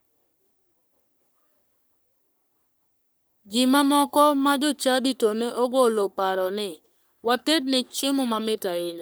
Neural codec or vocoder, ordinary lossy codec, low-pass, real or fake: codec, 44.1 kHz, 3.4 kbps, Pupu-Codec; none; none; fake